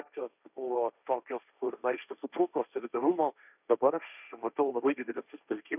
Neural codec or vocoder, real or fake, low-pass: codec, 16 kHz, 1.1 kbps, Voila-Tokenizer; fake; 3.6 kHz